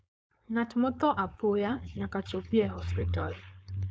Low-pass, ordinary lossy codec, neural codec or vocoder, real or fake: none; none; codec, 16 kHz, 4.8 kbps, FACodec; fake